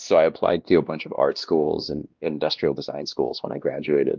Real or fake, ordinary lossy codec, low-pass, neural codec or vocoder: fake; Opus, 24 kbps; 7.2 kHz; codec, 16 kHz, 1 kbps, X-Codec, WavLM features, trained on Multilingual LibriSpeech